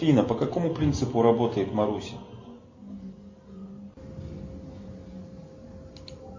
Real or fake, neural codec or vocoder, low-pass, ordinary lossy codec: real; none; 7.2 kHz; MP3, 32 kbps